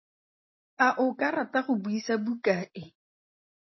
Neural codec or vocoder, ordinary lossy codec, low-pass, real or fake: none; MP3, 24 kbps; 7.2 kHz; real